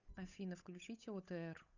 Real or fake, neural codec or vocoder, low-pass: fake; codec, 16 kHz, 8 kbps, FunCodec, trained on Chinese and English, 25 frames a second; 7.2 kHz